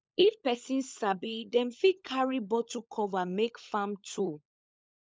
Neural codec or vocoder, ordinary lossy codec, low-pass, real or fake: codec, 16 kHz, 16 kbps, FunCodec, trained on LibriTTS, 50 frames a second; none; none; fake